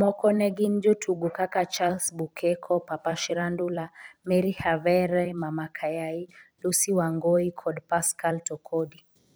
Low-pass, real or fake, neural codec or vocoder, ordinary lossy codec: none; fake; vocoder, 44.1 kHz, 128 mel bands every 256 samples, BigVGAN v2; none